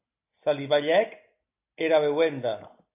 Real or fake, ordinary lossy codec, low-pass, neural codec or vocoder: real; AAC, 24 kbps; 3.6 kHz; none